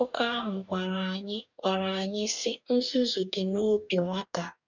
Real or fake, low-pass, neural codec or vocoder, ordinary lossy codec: fake; 7.2 kHz; codec, 44.1 kHz, 2.6 kbps, DAC; none